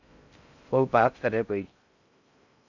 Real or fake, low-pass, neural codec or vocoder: fake; 7.2 kHz; codec, 16 kHz in and 24 kHz out, 0.6 kbps, FocalCodec, streaming, 4096 codes